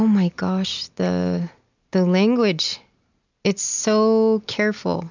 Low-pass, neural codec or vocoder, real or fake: 7.2 kHz; none; real